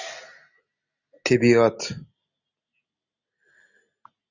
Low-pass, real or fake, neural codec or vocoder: 7.2 kHz; real; none